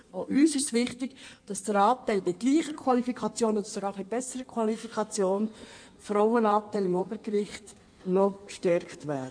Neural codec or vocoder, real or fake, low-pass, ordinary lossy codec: codec, 16 kHz in and 24 kHz out, 1.1 kbps, FireRedTTS-2 codec; fake; 9.9 kHz; none